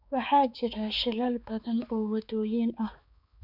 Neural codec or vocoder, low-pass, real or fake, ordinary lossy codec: codec, 16 kHz, 4 kbps, X-Codec, HuBERT features, trained on balanced general audio; 5.4 kHz; fake; none